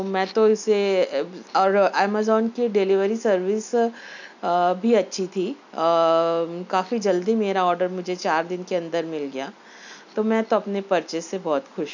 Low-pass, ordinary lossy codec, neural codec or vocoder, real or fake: 7.2 kHz; none; none; real